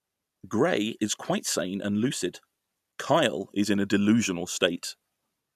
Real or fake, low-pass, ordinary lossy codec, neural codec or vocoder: real; 14.4 kHz; none; none